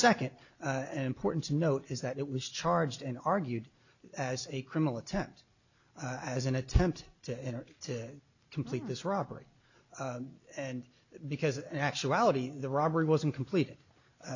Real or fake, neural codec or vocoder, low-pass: real; none; 7.2 kHz